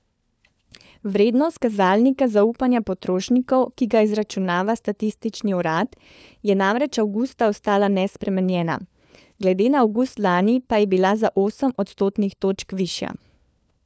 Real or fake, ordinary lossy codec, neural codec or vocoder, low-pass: fake; none; codec, 16 kHz, 4 kbps, FunCodec, trained on LibriTTS, 50 frames a second; none